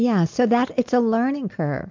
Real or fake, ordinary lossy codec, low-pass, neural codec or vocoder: real; MP3, 64 kbps; 7.2 kHz; none